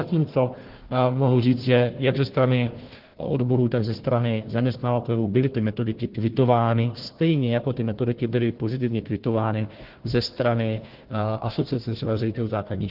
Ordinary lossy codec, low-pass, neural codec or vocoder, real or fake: Opus, 16 kbps; 5.4 kHz; codec, 16 kHz, 1 kbps, FunCodec, trained on Chinese and English, 50 frames a second; fake